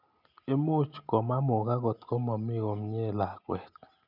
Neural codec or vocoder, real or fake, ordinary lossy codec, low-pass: none; real; none; 5.4 kHz